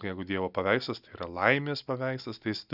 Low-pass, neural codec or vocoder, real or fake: 5.4 kHz; none; real